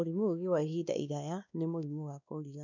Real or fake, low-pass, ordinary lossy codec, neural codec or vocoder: fake; 7.2 kHz; none; codec, 16 kHz, 2 kbps, X-Codec, WavLM features, trained on Multilingual LibriSpeech